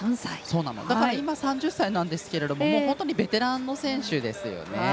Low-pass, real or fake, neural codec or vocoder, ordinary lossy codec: none; real; none; none